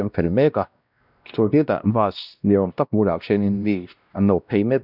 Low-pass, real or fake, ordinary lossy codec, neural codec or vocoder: 5.4 kHz; fake; none; codec, 16 kHz, 1 kbps, X-Codec, WavLM features, trained on Multilingual LibriSpeech